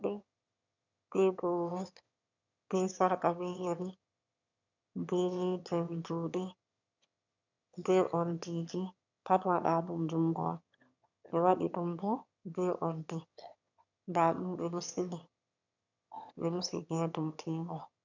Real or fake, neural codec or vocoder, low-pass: fake; autoencoder, 22.05 kHz, a latent of 192 numbers a frame, VITS, trained on one speaker; 7.2 kHz